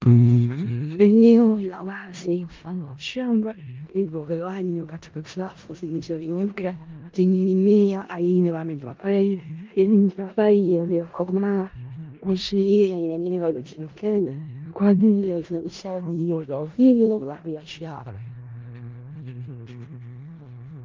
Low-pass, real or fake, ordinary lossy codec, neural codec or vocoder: 7.2 kHz; fake; Opus, 32 kbps; codec, 16 kHz in and 24 kHz out, 0.4 kbps, LongCat-Audio-Codec, four codebook decoder